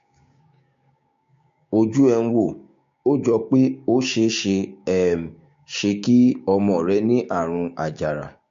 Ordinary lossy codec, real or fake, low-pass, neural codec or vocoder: MP3, 48 kbps; fake; 7.2 kHz; codec, 16 kHz, 6 kbps, DAC